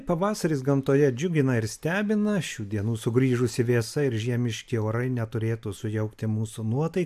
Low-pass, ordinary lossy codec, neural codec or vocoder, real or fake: 14.4 kHz; AAC, 96 kbps; none; real